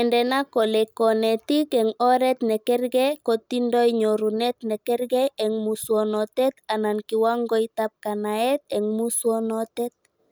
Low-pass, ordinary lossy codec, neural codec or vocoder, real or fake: none; none; none; real